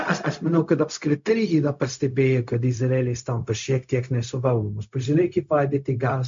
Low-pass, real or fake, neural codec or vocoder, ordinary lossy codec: 7.2 kHz; fake; codec, 16 kHz, 0.4 kbps, LongCat-Audio-Codec; MP3, 48 kbps